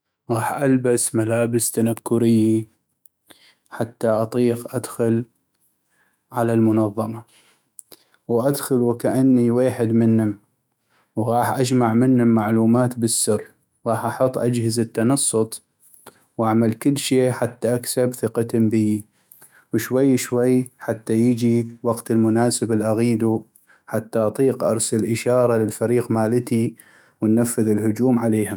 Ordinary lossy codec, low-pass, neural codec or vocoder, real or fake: none; none; autoencoder, 48 kHz, 128 numbers a frame, DAC-VAE, trained on Japanese speech; fake